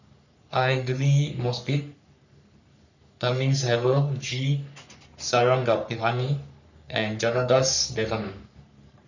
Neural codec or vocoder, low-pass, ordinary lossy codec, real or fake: codec, 44.1 kHz, 3.4 kbps, Pupu-Codec; 7.2 kHz; none; fake